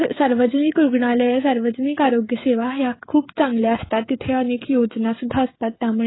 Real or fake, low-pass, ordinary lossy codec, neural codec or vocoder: fake; 7.2 kHz; AAC, 16 kbps; codec, 16 kHz, 16 kbps, FreqCodec, smaller model